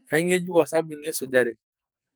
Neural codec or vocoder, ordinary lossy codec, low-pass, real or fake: codec, 44.1 kHz, 2.6 kbps, SNAC; none; none; fake